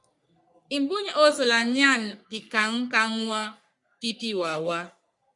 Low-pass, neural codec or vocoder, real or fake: 10.8 kHz; codec, 44.1 kHz, 3.4 kbps, Pupu-Codec; fake